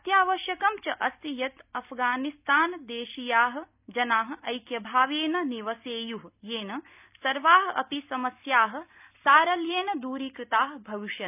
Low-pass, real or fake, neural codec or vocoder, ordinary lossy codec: 3.6 kHz; real; none; none